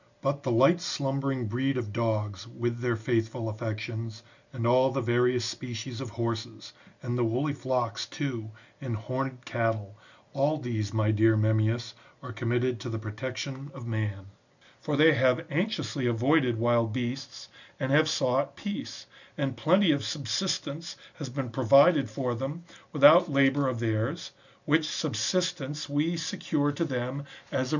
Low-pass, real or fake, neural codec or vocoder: 7.2 kHz; real; none